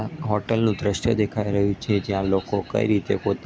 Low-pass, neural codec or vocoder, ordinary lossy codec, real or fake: none; none; none; real